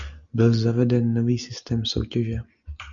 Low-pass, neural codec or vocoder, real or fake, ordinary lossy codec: 7.2 kHz; none; real; AAC, 64 kbps